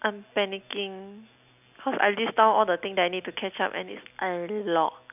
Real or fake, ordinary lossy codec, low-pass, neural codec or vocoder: real; none; 3.6 kHz; none